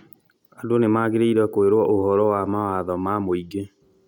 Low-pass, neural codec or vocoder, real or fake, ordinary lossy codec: 19.8 kHz; none; real; none